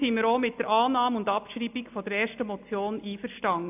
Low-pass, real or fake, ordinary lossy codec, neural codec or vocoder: 3.6 kHz; real; AAC, 32 kbps; none